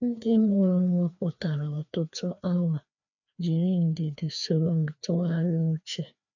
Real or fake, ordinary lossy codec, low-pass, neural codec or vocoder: fake; none; 7.2 kHz; codec, 16 kHz, 2 kbps, FreqCodec, larger model